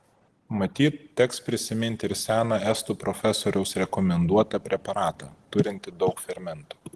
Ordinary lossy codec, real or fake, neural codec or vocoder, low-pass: Opus, 16 kbps; real; none; 10.8 kHz